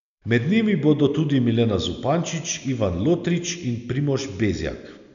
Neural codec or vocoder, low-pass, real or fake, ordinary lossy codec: none; 7.2 kHz; real; none